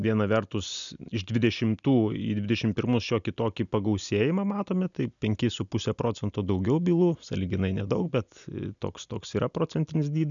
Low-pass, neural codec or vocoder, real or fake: 7.2 kHz; none; real